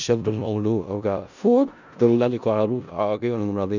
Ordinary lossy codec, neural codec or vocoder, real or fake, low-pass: none; codec, 16 kHz in and 24 kHz out, 0.4 kbps, LongCat-Audio-Codec, four codebook decoder; fake; 7.2 kHz